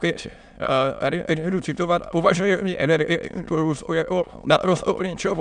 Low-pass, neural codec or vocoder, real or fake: 9.9 kHz; autoencoder, 22.05 kHz, a latent of 192 numbers a frame, VITS, trained on many speakers; fake